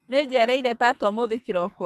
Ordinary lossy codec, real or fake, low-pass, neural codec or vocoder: none; fake; 14.4 kHz; codec, 44.1 kHz, 2.6 kbps, SNAC